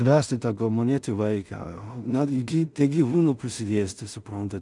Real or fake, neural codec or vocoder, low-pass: fake; codec, 16 kHz in and 24 kHz out, 0.4 kbps, LongCat-Audio-Codec, two codebook decoder; 10.8 kHz